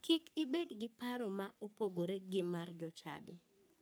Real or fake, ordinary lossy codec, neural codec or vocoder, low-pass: fake; none; codec, 44.1 kHz, 3.4 kbps, Pupu-Codec; none